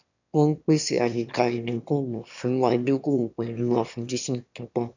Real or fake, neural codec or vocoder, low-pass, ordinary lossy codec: fake; autoencoder, 22.05 kHz, a latent of 192 numbers a frame, VITS, trained on one speaker; 7.2 kHz; none